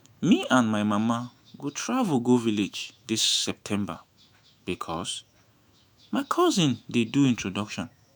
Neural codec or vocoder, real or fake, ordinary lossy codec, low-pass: autoencoder, 48 kHz, 128 numbers a frame, DAC-VAE, trained on Japanese speech; fake; none; none